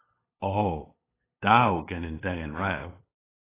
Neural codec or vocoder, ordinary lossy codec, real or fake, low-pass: codec, 16 kHz, 8 kbps, FunCodec, trained on LibriTTS, 25 frames a second; AAC, 16 kbps; fake; 3.6 kHz